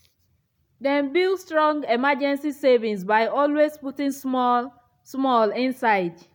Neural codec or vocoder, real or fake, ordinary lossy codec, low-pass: none; real; none; 19.8 kHz